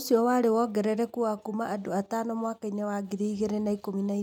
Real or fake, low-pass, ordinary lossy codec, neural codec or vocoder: real; 19.8 kHz; none; none